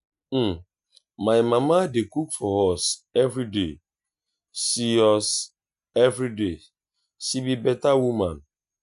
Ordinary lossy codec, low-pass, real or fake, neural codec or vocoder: none; 10.8 kHz; real; none